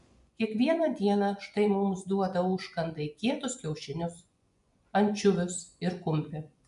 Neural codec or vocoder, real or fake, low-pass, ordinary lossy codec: vocoder, 24 kHz, 100 mel bands, Vocos; fake; 10.8 kHz; MP3, 96 kbps